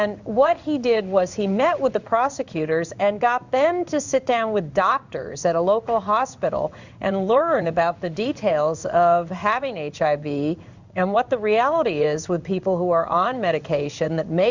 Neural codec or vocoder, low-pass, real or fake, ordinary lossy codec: codec, 16 kHz in and 24 kHz out, 1 kbps, XY-Tokenizer; 7.2 kHz; fake; Opus, 64 kbps